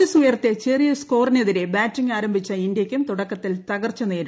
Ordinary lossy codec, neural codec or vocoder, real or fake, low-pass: none; none; real; none